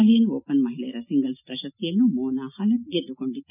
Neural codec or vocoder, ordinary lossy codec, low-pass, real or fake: none; none; 3.6 kHz; real